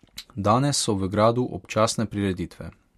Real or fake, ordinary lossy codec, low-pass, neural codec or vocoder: real; MP3, 64 kbps; 14.4 kHz; none